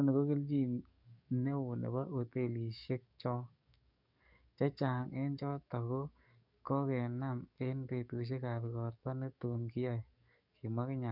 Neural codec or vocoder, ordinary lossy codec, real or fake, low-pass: codec, 44.1 kHz, 7.8 kbps, DAC; none; fake; 5.4 kHz